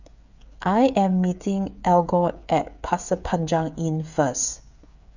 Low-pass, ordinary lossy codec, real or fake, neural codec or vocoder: 7.2 kHz; none; fake; codec, 16 kHz, 8 kbps, FreqCodec, smaller model